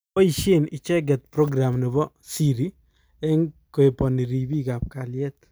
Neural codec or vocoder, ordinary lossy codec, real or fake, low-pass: none; none; real; none